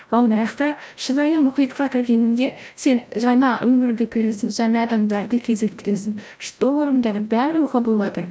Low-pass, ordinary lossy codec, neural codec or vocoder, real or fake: none; none; codec, 16 kHz, 0.5 kbps, FreqCodec, larger model; fake